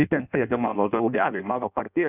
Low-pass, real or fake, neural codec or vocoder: 3.6 kHz; fake; codec, 16 kHz in and 24 kHz out, 0.6 kbps, FireRedTTS-2 codec